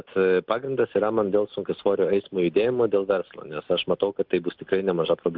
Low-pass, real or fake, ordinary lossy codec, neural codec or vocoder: 5.4 kHz; real; Opus, 32 kbps; none